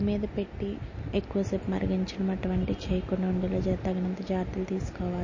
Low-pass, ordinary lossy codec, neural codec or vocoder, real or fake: 7.2 kHz; MP3, 32 kbps; none; real